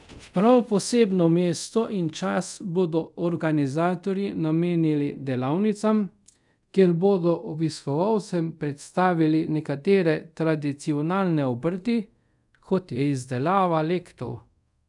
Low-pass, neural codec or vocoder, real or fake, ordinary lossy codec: 10.8 kHz; codec, 24 kHz, 0.5 kbps, DualCodec; fake; none